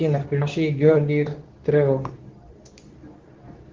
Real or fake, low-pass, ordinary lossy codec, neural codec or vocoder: fake; 7.2 kHz; Opus, 16 kbps; codec, 24 kHz, 0.9 kbps, WavTokenizer, medium speech release version 2